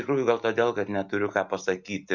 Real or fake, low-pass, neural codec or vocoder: real; 7.2 kHz; none